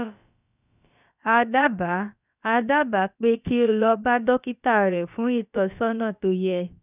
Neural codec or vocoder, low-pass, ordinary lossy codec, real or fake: codec, 16 kHz, about 1 kbps, DyCAST, with the encoder's durations; 3.6 kHz; none; fake